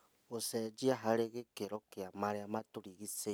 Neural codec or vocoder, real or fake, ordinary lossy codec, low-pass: none; real; none; none